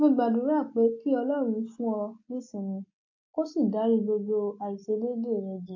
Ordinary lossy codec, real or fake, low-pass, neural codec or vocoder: AAC, 48 kbps; real; 7.2 kHz; none